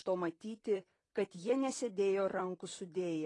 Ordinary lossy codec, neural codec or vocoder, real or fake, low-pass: AAC, 32 kbps; vocoder, 44.1 kHz, 128 mel bands, Pupu-Vocoder; fake; 10.8 kHz